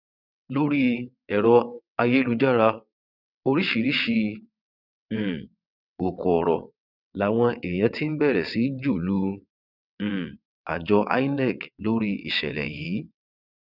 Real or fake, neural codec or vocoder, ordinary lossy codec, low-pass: fake; vocoder, 22.05 kHz, 80 mel bands, WaveNeXt; none; 5.4 kHz